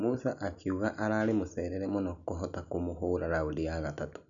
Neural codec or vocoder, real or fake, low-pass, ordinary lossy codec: none; real; 7.2 kHz; none